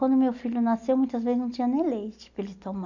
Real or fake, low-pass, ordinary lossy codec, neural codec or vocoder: real; 7.2 kHz; none; none